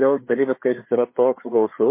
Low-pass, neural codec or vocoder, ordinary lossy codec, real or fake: 3.6 kHz; codec, 16 kHz, 4 kbps, FreqCodec, larger model; MP3, 24 kbps; fake